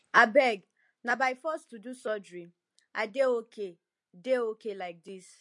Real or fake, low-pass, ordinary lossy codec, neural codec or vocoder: real; 10.8 kHz; MP3, 48 kbps; none